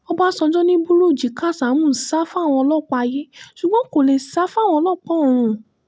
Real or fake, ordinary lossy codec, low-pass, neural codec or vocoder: real; none; none; none